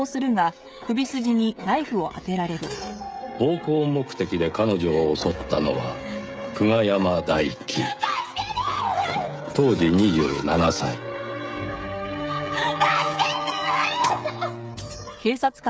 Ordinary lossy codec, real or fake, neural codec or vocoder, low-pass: none; fake; codec, 16 kHz, 16 kbps, FreqCodec, smaller model; none